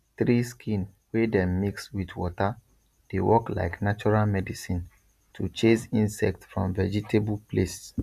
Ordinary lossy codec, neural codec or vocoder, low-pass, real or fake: none; none; 14.4 kHz; real